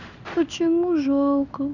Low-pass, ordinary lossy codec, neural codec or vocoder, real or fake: 7.2 kHz; none; codec, 16 kHz in and 24 kHz out, 1 kbps, XY-Tokenizer; fake